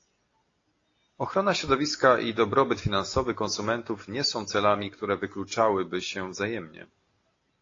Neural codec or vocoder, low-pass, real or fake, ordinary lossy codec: none; 7.2 kHz; real; AAC, 32 kbps